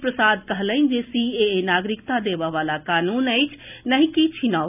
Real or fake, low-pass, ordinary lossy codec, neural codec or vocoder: real; 3.6 kHz; none; none